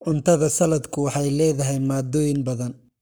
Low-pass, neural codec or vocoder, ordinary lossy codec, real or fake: none; codec, 44.1 kHz, 7.8 kbps, Pupu-Codec; none; fake